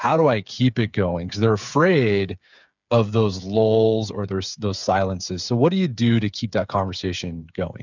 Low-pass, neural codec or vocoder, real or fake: 7.2 kHz; codec, 16 kHz, 8 kbps, FreqCodec, smaller model; fake